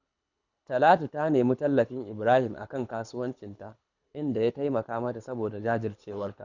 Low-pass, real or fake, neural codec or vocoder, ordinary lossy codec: 7.2 kHz; fake; codec, 24 kHz, 6 kbps, HILCodec; none